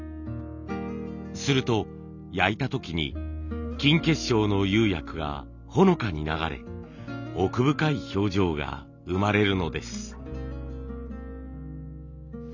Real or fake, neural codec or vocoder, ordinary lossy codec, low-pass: real; none; none; 7.2 kHz